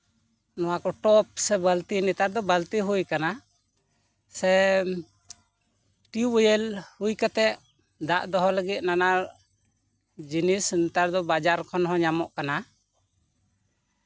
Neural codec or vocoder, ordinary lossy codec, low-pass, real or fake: none; none; none; real